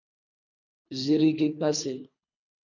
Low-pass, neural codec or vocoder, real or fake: 7.2 kHz; codec, 24 kHz, 3 kbps, HILCodec; fake